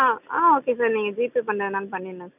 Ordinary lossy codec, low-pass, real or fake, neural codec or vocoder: none; 3.6 kHz; real; none